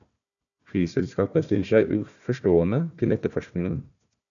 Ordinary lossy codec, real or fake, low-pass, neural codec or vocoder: MP3, 96 kbps; fake; 7.2 kHz; codec, 16 kHz, 1 kbps, FunCodec, trained on Chinese and English, 50 frames a second